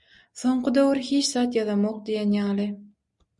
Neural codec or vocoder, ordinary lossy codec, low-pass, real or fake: none; MP3, 96 kbps; 10.8 kHz; real